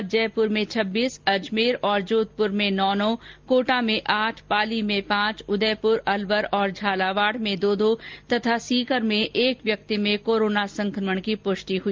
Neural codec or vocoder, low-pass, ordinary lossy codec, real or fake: none; 7.2 kHz; Opus, 32 kbps; real